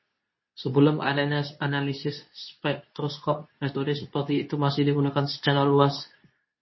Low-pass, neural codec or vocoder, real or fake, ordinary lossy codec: 7.2 kHz; codec, 24 kHz, 0.9 kbps, WavTokenizer, medium speech release version 2; fake; MP3, 24 kbps